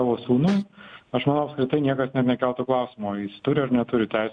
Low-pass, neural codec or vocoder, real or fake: 9.9 kHz; none; real